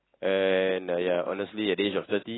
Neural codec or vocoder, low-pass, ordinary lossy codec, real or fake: none; 7.2 kHz; AAC, 16 kbps; real